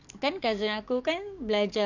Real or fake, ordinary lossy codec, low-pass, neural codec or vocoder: fake; none; 7.2 kHz; codec, 44.1 kHz, 7.8 kbps, DAC